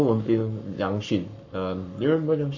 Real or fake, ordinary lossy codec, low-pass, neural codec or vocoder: fake; none; 7.2 kHz; codec, 44.1 kHz, 7.8 kbps, Pupu-Codec